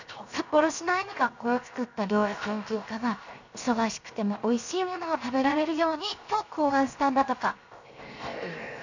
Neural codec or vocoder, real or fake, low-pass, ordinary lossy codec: codec, 16 kHz, 0.7 kbps, FocalCodec; fake; 7.2 kHz; none